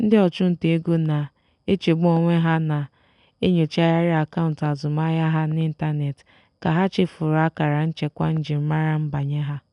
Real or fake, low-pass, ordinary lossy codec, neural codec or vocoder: real; 10.8 kHz; none; none